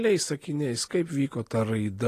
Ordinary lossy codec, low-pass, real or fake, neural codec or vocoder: AAC, 48 kbps; 14.4 kHz; real; none